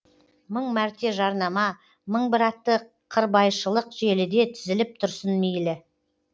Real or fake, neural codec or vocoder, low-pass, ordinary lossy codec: real; none; none; none